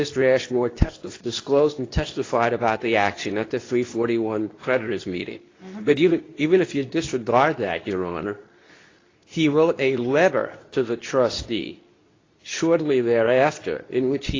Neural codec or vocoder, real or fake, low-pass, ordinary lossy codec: codec, 24 kHz, 0.9 kbps, WavTokenizer, medium speech release version 2; fake; 7.2 kHz; AAC, 32 kbps